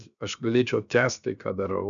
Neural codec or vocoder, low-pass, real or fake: codec, 16 kHz, 0.7 kbps, FocalCodec; 7.2 kHz; fake